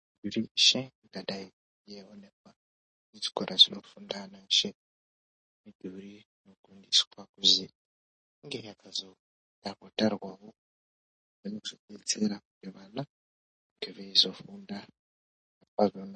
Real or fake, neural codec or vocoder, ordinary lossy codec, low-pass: real; none; MP3, 32 kbps; 10.8 kHz